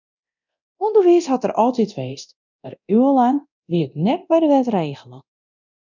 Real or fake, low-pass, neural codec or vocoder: fake; 7.2 kHz; codec, 24 kHz, 0.9 kbps, DualCodec